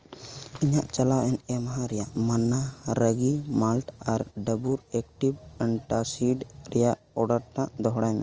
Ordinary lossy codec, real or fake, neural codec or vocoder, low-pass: Opus, 24 kbps; real; none; 7.2 kHz